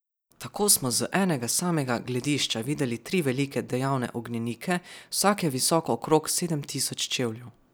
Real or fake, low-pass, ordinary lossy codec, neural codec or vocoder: real; none; none; none